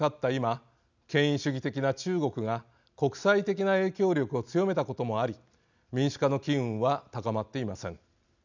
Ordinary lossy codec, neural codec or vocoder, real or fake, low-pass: none; none; real; 7.2 kHz